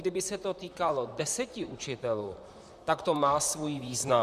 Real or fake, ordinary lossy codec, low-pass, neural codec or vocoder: fake; Opus, 64 kbps; 14.4 kHz; vocoder, 44.1 kHz, 128 mel bands, Pupu-Vocoder